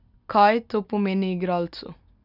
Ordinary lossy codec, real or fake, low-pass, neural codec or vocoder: none; real; 5.4 kHz; none